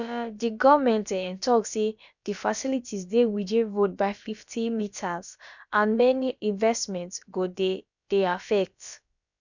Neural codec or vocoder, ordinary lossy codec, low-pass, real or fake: codec, 16 kHz, about 1 kbps, DyCAST, with the encoder's durations; none; 7.2 kHz; fake